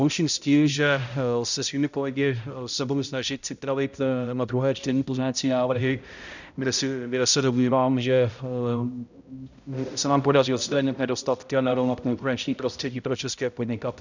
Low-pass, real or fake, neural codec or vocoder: 7.2 kHz; fake; codec, 16 kHz, 0.5 kbps, X-Codec, HuBERT features, trained on balanced general audio